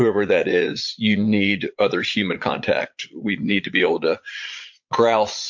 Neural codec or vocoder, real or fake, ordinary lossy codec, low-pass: vocoder, 22.05 kHz, 80 mel bands, Vocos; fake; MP3, 48 kbps; 7.2 kHz